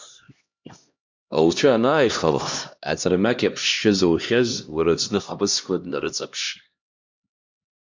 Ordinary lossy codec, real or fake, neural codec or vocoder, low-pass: MP3, 64 kbps; fake; codec, 16 kHz, 1 kbps, X-Codec, HuBERT features, trained on LibriSpeech; 7.2 kHz